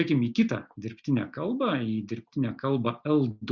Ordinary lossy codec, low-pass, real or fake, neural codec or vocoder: Opus, 64 kbps; 7.2 kHz; real; none